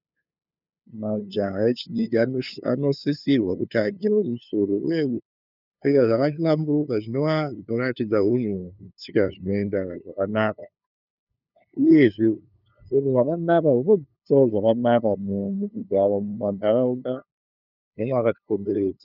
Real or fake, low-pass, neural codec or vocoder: fake; 5.4 kHz; codec, 16 kHz, 2 kbps, FunCodec, trained on LibriTTS, 25 frames a second